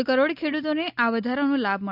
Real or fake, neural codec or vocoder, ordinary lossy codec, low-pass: real; none; none; 5.4 kHz